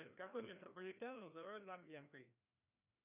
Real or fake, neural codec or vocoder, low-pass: fake; codec, 16 kHz, 1 kbps, FreqCodec, larger model; 3.6 kHz